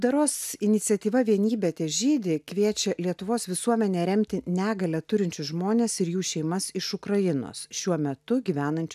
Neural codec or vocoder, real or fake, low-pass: none; real; 14.4 kHz